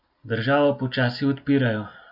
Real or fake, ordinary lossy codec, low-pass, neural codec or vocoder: real; none; 5.4 kHz; none